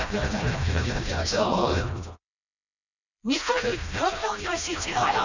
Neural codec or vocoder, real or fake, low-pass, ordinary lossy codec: codec, 16 kHz, 1 kbps, FreqCodec, smaller model; fake; 7.2 kHz; none